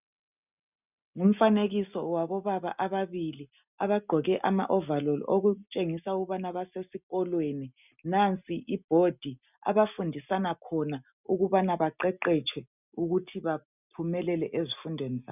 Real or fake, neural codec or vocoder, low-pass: real; none; 3.6 kHz